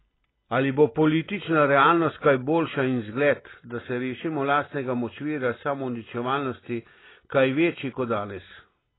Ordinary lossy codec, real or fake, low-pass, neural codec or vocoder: AAC, 16 kbps; real; 7.2 kHz; none